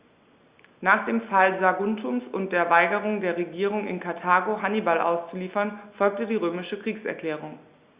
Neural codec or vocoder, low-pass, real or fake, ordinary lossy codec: none; 3.6 kHz; real; Opus, 64 kbps